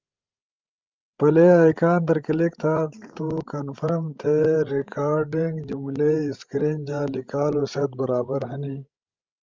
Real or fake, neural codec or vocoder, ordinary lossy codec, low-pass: fake; codec, 16 kHz, 16 kbps, FreqCodec, larger model; Opus, 32 kbps; 7.2 kHz